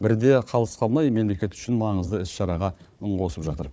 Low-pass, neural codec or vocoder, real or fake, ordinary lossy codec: none; codec, 16 kHz, 4 kbps, FunCodec, trained on Chinese and English, 50 frames a second; fake; none